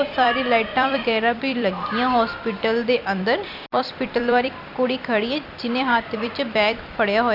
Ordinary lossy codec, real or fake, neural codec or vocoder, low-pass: AAC, 48 kbps; fake; vocoder, 44.1 kHz, 128 mel bands every 256 samples, BigVGAN v2; 5.4 kHz